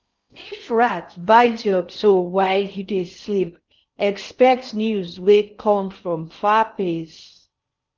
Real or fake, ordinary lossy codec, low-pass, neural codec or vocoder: fake; Opus, 24 kbps; 7.2 kHz; codec, 16 kHz in and 24 kHz out, 0.6 kbps, FocalCodec, streaming, 4096 codes